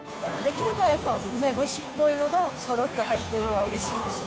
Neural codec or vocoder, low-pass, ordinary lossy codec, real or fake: codec, 16 kHz, 2 kbps, FunCodec, trained on Chinese and English, 25 frames a second; none; none; fake